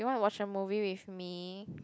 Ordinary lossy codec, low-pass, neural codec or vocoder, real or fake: none; none; none; real